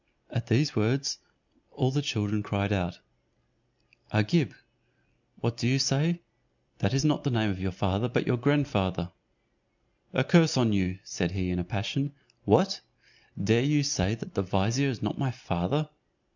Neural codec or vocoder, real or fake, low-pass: none; real; 7.2 kHz